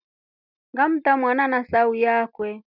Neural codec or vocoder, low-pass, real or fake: none; 5.4 kHz; real